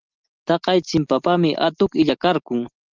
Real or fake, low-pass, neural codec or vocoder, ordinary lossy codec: real; 7.2 kHz; none; Opus, 24 kbps